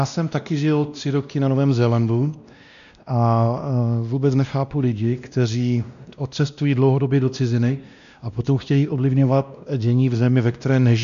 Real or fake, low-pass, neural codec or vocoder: fake; 7.2 kHz; codec, 16 kHz, 1 kbps, X-Codec, WavLM features, trained on Multilingual LibriSpeech